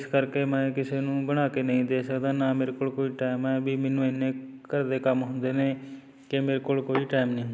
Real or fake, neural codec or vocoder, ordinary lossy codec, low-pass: real; none; none; none